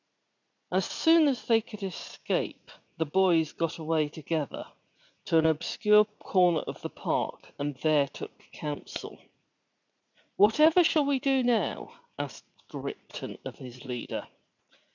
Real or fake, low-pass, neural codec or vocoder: fake; 7.2 kHz; vocoder, 44.1 kHz, 80 mel bands, Vocos